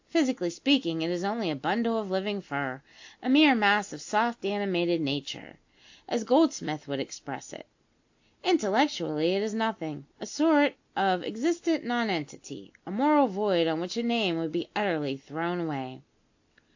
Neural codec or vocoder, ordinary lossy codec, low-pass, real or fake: none; AAC, 48 kbps; 7.2 kHz; real